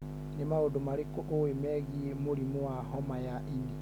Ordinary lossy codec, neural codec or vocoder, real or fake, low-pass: none; none; real; 19.8 kHz